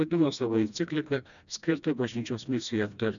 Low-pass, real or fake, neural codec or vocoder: 7.2 kHz; fake; codec, 16 kHz, 1 kbps, FreqCodec, smaller model